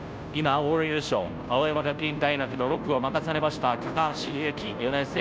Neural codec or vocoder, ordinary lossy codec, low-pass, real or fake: codec, 16 kHz, 0.5 kbps, FunCodec, trained on Chinese and English, 25 frames a second; none; none; fake